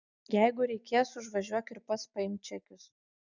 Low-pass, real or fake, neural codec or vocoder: 7.2 kHz; real; none